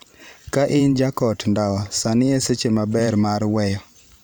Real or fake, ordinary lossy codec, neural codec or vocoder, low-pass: fake; none; vocoder, 44.1 kHz, 128 mel bands every 512 samples, BigVGAN v2; none